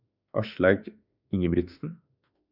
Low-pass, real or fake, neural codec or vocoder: 5.4 kHz; fake; autoencoder, 48 kHz, 32 numbers a frame, DAC-VAE, trained on Japanese speech